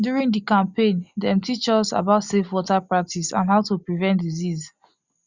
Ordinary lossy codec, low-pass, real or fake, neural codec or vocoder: Opus, 64 kbps; 7.2 kHz; real; none